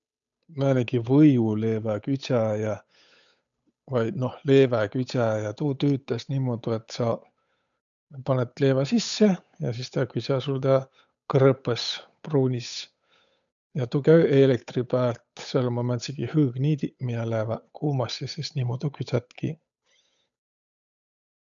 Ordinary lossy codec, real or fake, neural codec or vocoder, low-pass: none; fake; codec, 16 kHz, 8 kbps, FunCodec, trained on Chinese and English, 25 frames a second; 7.2 kHz